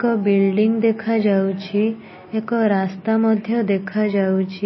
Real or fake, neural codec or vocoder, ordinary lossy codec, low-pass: real; none; MP3, 24 kbps; 7.2 kHz